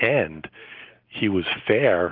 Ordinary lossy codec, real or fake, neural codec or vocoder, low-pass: Opus, 24 kbps; real; none; 5.4 kHz